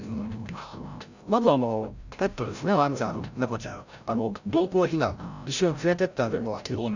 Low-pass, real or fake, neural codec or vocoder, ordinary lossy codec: 7.2 kHz; fake; codec, 16 kHz, 0.5 kbps, FreqCodec, larger model; none